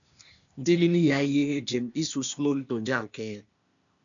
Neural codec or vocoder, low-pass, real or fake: codec, 16 kHz, 0.8 kbps, ZipCodec; 7.2 kHz; fake